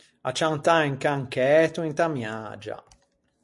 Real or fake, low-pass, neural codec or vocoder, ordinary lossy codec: real; 10.8 kHz; none; MP3, 48 kbps